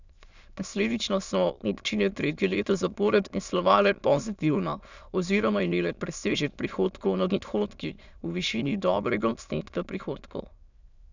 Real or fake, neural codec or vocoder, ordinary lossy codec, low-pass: fake; autoencoder, 22.05 kHz, a latent of 192 numbers a frame, VITS, trained on many speakers; none; 7.2 kHz